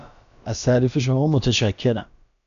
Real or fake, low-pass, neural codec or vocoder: fake; 7.2 kHz; codec, 16 kHz, about 1 kbps, DyCAST, with the encoder's durations